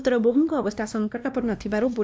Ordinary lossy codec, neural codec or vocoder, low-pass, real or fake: none; codec, 16 kHz, 1 kbps, X-Codec, WavLM features, trained on Multilingual LibriSpeech; none; fake